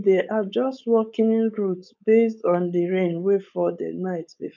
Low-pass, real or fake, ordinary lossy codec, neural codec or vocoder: 7.2 kHz; fake; none; codec, 16 kHz, 4.8 kbps, FACodec